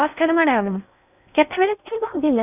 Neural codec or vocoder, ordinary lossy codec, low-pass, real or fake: codec, 16 kHz in and 24 kHz out, 0.6 kbps, FocalCodec, streaming, 4096 codes; none; 3.6 kHz; fake